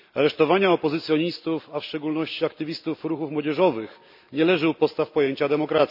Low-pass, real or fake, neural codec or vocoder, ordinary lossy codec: 5.4 kHz; real; none; none